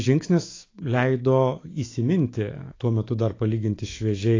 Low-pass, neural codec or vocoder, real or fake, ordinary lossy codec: 7.2 kHz; autoencoder, 48 kHz, 128 numbers a frame, DAC-VAE, trained on Japanese speech; fake; AAC, 32 kbps